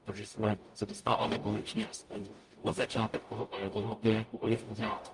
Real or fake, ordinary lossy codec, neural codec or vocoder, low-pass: fake; Opus, 32 kbps; codec, 44.1 kHz, 0.9 kbps, DAC; 10.8 kHz